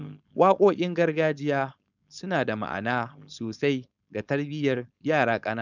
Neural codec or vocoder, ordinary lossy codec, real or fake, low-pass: codec, 16 kHz, 4.8 kbps, FACodec; none; fake; 7.2 kHz